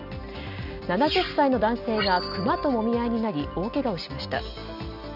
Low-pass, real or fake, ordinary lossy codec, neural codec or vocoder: 5.4 kHz; real; none; none